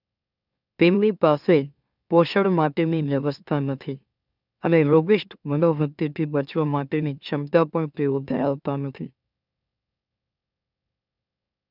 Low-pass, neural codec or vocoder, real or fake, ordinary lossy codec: 5.4 kHz; autoencoder, 44.1 kHz, a latent of 192 numbers a frame, MeloTTS; fake; none